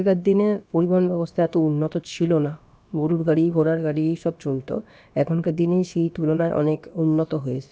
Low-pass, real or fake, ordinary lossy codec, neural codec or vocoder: none; fake; none; codec, 16 kHz, about 1 kbps, DyCAST, with the encoder's durations